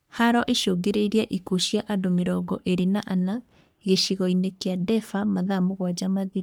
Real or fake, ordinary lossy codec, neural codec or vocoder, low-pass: fake; none; codec, 44.1 kHz, 3.4 kbps, Pupu-Codec; none